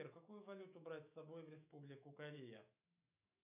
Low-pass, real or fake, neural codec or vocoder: 3.6 kHz; fake; autoencoder, 48 kHz, 128 numbers a frame, DAC-VAE, trained on Japanese speech